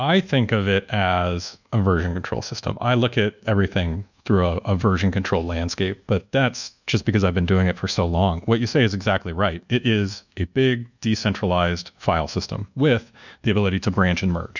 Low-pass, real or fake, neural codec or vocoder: 7.2 kHz; fake; codec, 24 kHz, 1.2 kbps, DualCodec